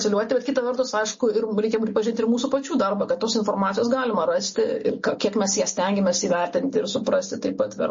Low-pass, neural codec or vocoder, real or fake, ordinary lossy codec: 7.2 kHz; none; real; MP3, 32 kbps